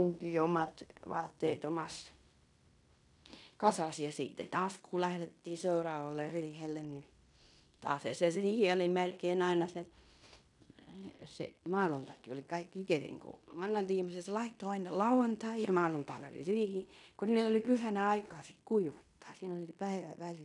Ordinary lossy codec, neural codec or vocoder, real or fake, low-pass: none; codec, 16 kHz in and 24 kHz out, 0.9 kbps, LongCat-Audio-Codec, fine tuned four codebook decoder; fake; 10.8 kHz